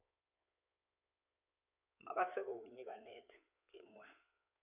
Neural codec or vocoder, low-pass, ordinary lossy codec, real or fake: codec, 16 kHz in and 24 kHz out, 2.2 kbps, FireRedTTS-2 codec; 3.6 kHz; none; fake